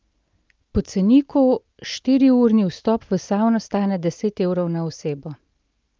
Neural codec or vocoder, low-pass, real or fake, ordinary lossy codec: none; 7.2 kHz; real; Opus, 24 kbps